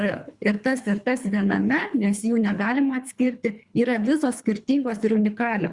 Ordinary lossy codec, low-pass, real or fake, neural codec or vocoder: Opus, 64 kbps; 10.8 kHz; fake; codec, 24 kHz, 3 kbps, HILCodec